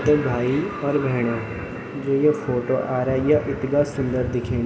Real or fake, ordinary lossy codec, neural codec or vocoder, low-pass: real; none; none; none